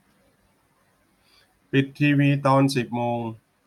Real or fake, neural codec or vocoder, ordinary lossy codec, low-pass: real; none; none; 19.8 kHz